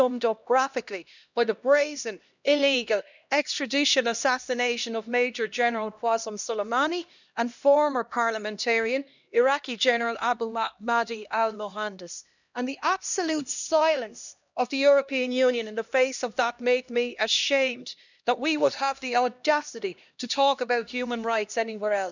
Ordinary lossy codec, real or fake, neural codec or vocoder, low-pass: none; fake; codec, 16 kHz, 1 kbps, X-Codec, HuBERT features, trained on LibriSpeech; 7.2 kHz